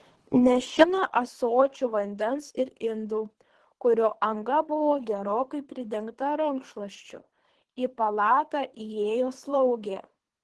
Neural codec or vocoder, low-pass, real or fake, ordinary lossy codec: codec, 24 kHz, 3 kbps, HILCodec; 10.8 kHz; fake; Opus, 16 kbps